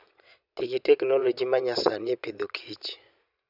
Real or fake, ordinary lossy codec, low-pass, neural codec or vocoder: fake; none; 5.4 kHz; vocoder, 22.05 kHz, 80 mel bands, Vocos